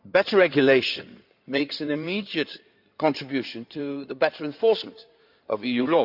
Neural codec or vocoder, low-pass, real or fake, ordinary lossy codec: codec, 16 kHz in and 24 kHz out, 2.2 kbps, FireRedTTS-2 codec; 5.4 kHz; fake; none